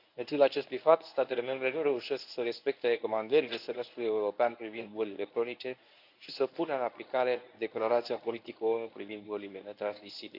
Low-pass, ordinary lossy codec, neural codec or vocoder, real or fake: 5.4 kHz; none; codec, 24 kHz, 0.9 kbps, WavTokenizer, medium speech release version 1; fake